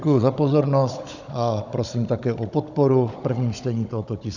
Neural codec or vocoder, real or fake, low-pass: codec, 16 kHz, 16 kbps, FunCodec, trained on Chinese and English, 50 frames a second; fake; 7.2 kHz